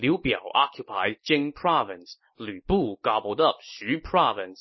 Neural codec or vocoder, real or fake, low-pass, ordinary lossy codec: none; real; 7.2 kHz; MP3, 24 kbps